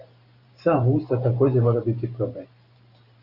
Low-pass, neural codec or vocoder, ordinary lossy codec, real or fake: 5.4 kHz; vocoder, 44.1 kHz, 128 mel bands every 512 samples, BigVGAN v2; Opus, 64 kbps; fake